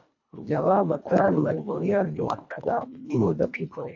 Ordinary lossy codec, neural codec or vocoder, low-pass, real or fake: AAC, 48 kbps; codec, 24 kHz, 1.5 kbps, HILCodec; 7.2 kHz; fake